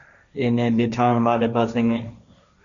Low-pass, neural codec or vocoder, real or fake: 7.2 kHz; codec, 16 kHz, 1.1 kbps, Voila-Tokenizer; fake